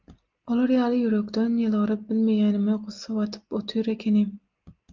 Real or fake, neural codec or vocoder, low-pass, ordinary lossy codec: real; none; 7.2 kHz; Opus, 24 kbps